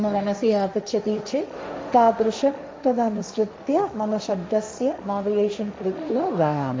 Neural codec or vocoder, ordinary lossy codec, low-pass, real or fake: codec, 16 kHz, 1.1 kbps, Voila-Tokenizer; none; none; fake